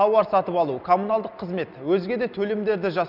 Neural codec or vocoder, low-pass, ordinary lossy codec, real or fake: none; 5.4 kHz; none; real